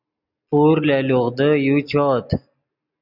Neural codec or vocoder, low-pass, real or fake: none; 5.4 kHz; real